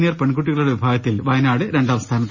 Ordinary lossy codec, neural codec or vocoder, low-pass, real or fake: none; none; 7.2 kHz; real